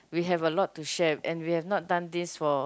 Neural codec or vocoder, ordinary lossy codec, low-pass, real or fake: none; none; none; real